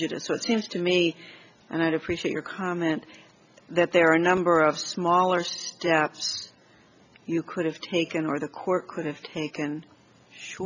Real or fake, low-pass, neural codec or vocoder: real; 7.2 kHz; none